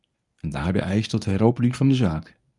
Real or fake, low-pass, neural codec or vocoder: fake; 10.8 kHz; codec, 24 kHz, 0.9 kbps, WavTokenizer, medium speech release version 1